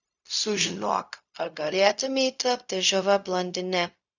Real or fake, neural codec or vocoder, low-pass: fake; codec, 16 kHz, 0.4 kbps, LongCat-Audio-Codec; 7.2 kHz